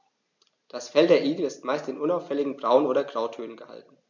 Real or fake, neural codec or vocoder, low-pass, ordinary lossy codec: real; none; 7.2 kHz; none